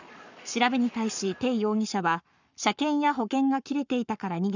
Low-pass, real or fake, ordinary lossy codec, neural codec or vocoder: 7.2 kHz; fake; none; codec, 44.1 kHz, 7.8 kbps, Pupu-Codec